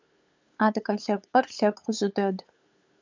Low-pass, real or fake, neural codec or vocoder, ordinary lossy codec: 7.2 kHz; fake; codec, 16 kHz, 8 kbps, FunCodec, trained on LibriTTS, 25 frames a second; MP3, 64 kbps